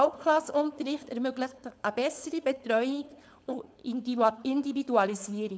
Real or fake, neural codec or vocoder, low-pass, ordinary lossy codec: fake; codec, 16 kHz, 4.8 kbps, FACodec; none; none